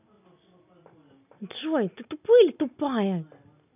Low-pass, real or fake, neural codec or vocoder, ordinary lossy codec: 3.6 kHz; real; none; none